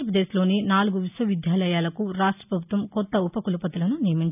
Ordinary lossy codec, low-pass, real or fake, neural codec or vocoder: none; 3.6 kHz; real; none